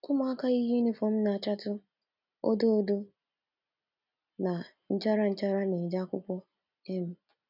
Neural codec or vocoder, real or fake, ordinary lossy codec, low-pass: none; real; none; 5.4 kHz